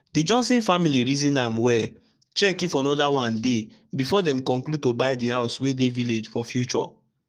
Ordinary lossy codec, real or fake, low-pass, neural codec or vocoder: Opus, 32 kbps; fake; 14.4 kHz; codec, 32 kHz, 1.9 kbps, SNAC